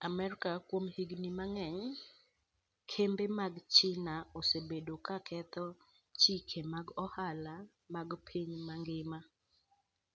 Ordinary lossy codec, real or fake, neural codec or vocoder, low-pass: none; real; none; none